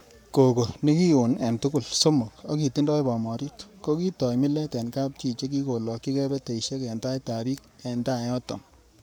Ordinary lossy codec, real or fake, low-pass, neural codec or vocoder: none; fake; none; codec, 44.1 kHz, 7.8 kbps, Pupu-Codec